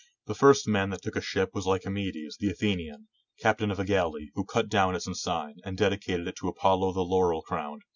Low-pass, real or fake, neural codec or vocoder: 7.2 kHz; real; none